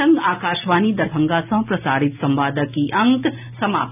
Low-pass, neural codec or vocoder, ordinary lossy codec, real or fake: 3.6 kHz; none; none; real